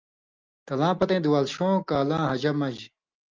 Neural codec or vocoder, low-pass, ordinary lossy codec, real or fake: none; 7.2 kHz; Opus, 24 kbps; real